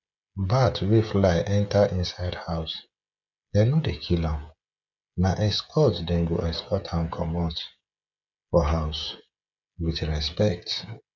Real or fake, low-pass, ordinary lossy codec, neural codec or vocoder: fake; 7.2 kHz; none; codec, 16 kHz, 16 kbps, FreqCodec, smaller model